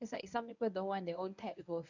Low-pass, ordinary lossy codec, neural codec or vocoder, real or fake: 7.2 kHz; none; codec, 24 kHz, 0.9 kbps, WavTokenizer, medium speech release version 1; fake